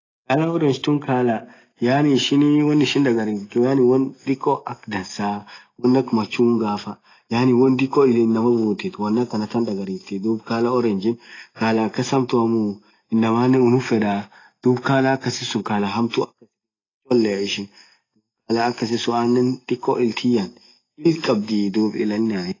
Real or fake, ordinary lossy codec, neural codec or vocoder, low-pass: real; AAC, 32 kbps; none; 7.2 kHz